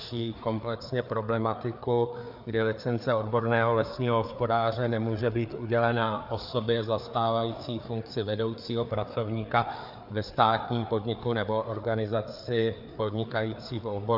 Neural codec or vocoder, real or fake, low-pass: codec, 16 kHz, 4 kbps, FreqCodec, larger model; fake; 5.4 kHz